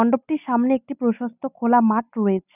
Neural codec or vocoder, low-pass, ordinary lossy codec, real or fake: none; 3.6 kHz; none; real